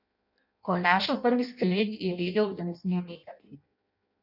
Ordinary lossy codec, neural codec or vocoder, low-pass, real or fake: none; codec, 16 kHz in and 24 kHz out, 0.6 kbps, FireRedTTS-2 codec; 5.4 kHz; fake